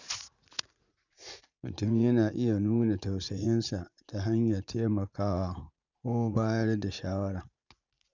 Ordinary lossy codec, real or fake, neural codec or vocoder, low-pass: none; fake; vocoder, 44.1 kHz, 80 mel bands, Vocos; 7.2 kHz